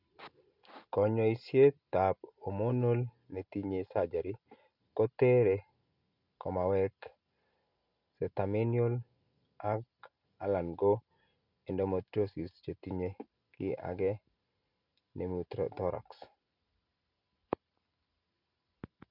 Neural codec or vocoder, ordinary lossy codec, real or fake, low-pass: none; none; real; 5.4 kHz